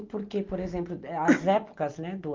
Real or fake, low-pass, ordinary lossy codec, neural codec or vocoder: real; 7.2 kHz; Opus, 24 kbps; none